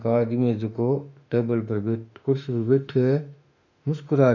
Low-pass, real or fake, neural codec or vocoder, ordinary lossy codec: 7.2 kHz; fake; autoencoder, 48 kHz, 32 numbers a frame, DAC-VAE, trained on Japanese speech; none